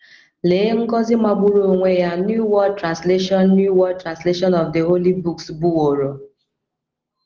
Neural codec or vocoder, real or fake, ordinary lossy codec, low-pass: none; real; Opus, 16 kbps; 7.2 kHz